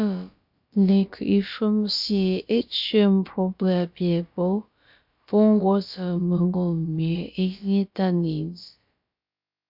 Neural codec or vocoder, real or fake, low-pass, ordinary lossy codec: codec, 16 kHz, about 1 kbps, DyCAST, with the encoder's durations; fake; 5.4 kHz; MP3, 48 kbps